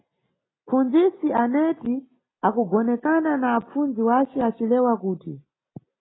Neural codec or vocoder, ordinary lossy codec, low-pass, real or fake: none; AAC, 16 kbps; 7.2 kHz; real